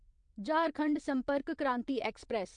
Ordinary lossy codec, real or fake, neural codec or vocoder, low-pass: MP3, 64 kbps; fake; vocoder, 22.05 kHz, 80 mel bands, WaveNeXt; 9.9 kHz